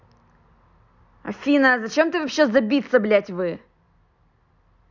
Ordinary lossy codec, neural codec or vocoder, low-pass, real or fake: none; none; 7.2 kHz; real